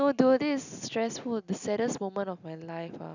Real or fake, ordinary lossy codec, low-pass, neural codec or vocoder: real; none; 7.2 kHz; none